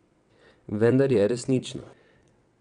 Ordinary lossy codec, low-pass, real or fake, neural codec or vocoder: none; 9.9 kHz; fake; vocoder, 22.05 kHz, 80 mel bands, WaveNeXt